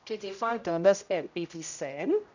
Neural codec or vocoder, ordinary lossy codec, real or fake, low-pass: codec, 16 kHz, 0.5 kbps, X-Codec, HuBERT features, trained on general audio; none; fake; 7.2 kHz